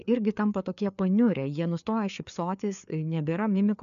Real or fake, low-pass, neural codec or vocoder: fake; 7.2 kHz; codec, 16 kHz, 4 kbps, FreqCodec, larger model